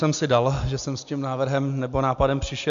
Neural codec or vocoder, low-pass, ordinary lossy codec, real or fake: none; 7.2 kHz; MP3, 64 kbps; real